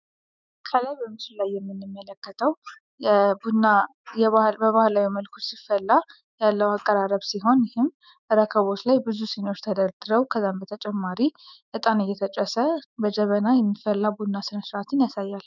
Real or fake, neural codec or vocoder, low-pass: fake; autoencoder, 48 kHz, 128 numbers a frame, DAC-VAE, trained on Japanese speech; 7.2 kHz